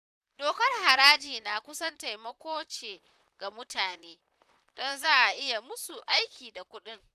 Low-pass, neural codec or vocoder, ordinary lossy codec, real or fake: 14.4 kHz; vocoder, 44.1 kHz, 128 mel bands, Pupu-Vocoder; none; fake